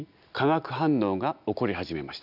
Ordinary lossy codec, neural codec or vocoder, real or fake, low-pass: none; none; real; 5.4 kHz